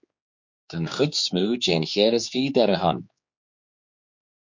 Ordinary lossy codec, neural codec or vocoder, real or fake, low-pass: MP3, 48 kbps; codec, 16 kHz, 4 kbps, X-Codec, HuBERT features, trained on general audio; fake; 7.2 kHz